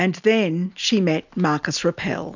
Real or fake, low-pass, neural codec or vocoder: real; 7.2 kHz; none